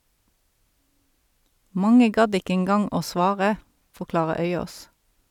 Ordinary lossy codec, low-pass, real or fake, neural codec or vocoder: none; 19.8 kHz; real; none